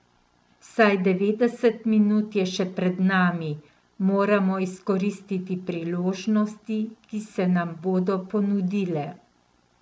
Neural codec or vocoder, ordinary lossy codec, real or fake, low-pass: none; none; real; none